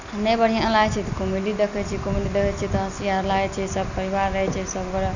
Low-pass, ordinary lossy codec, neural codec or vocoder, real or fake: 7.2 kHz; none; none; real